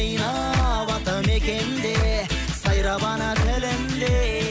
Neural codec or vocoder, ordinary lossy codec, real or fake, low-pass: none; none; real; none